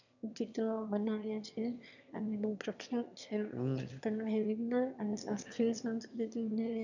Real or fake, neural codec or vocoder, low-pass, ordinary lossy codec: fake; autoencoder, 22.05 kHz, a latent of 192 numbers a frame, VITS, trained on one speaker; 7.2 kHz; none